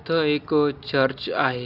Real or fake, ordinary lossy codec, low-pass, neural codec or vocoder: real; none; 5.4 kHz; none